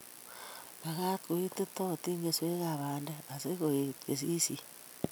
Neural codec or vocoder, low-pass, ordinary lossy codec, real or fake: none; none; none; real